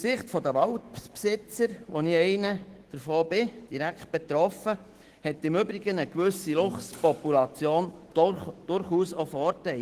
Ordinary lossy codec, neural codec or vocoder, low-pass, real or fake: Opus, 16 kbps; autoencoder, 48 kHz, 128 numbers a frame, DAC-VAE, trained on Japanese speech; 14.4 kHz; fake